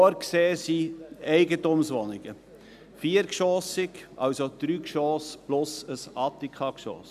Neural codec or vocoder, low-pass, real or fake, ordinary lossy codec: none; 14.4 kHz; real; none